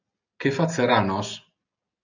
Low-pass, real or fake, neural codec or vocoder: 7.2 kHz; real; none